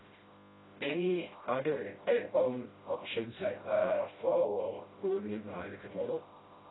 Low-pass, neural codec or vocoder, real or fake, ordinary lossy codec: 7.2 kHz; codec, 16 kHz, 0.5 kbps, FreqCodec, smaller model; fake; AAC, 16 kbps